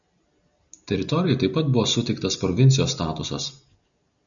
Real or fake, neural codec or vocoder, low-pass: real; none; 7.2 kHz